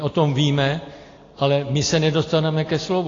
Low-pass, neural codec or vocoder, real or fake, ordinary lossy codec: 7.2 kHz; none; real; AAC, 32 kbps